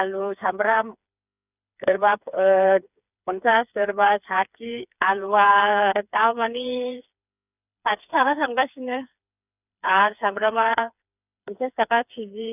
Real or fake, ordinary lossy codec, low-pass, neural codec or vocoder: fake; none; 3.6 kHz; codec, 16 kHz, 4 kbps, FreqCodec, smaller model